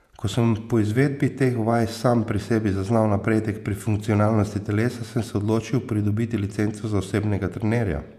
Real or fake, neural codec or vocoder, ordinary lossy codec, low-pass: fake; vocoder, 48 kHz, 128 mel bands, Vocos; none; 14.4 kHz